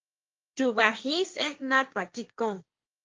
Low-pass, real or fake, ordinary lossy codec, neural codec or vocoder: 7.2 kHz; fake; Opus, 24 kbps; codec, 16 kHz, 1.1 kbps, Voila-Tokenizer